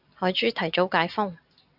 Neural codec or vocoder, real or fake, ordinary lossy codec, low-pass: none; real; AAC, 48 kbps; 5.4 kHz